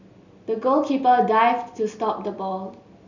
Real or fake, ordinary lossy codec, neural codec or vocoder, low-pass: real; none; none; 7.2 kHz